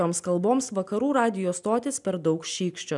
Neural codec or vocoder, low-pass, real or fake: none; 10.8 kHz; real